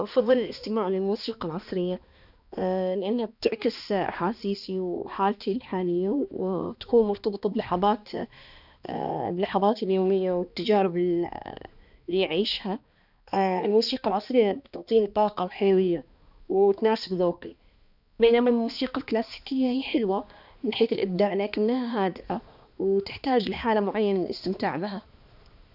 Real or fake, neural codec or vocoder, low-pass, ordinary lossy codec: fake; codec, 16 kHz, 2 kbps, X-Codec, HuBERT features, trained on balanced general audio; 5.4 kHz; none